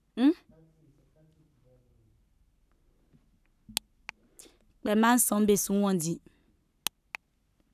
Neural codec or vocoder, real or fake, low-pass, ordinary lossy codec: codec, 44.1 kHz, 7.8 kbps, Pupu-Codec; fake; 14.4 kHz; none